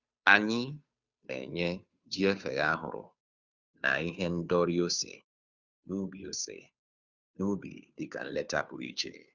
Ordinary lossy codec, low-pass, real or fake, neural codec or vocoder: Opus, 64 kbps; 7.2 kHz; fake; codec, 16 kHz, 2 kbps, FunCodec, trained on Chinese and English, 25 frames a second